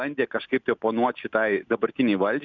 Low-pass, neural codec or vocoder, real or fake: 7.2 kHz; none; real